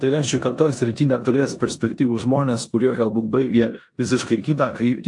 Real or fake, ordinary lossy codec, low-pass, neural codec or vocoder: fake; AAC, 64 kbps; 10.8 kHz; codec, 16 kHz in and 24 kHz out, 0.9 kbps, LongCat-Audio-Codec, four codebook decoder